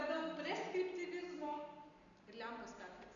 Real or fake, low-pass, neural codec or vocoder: real; 7.2 kHz; none